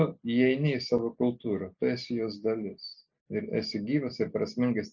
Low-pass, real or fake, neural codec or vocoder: 7.2 kHz; real; none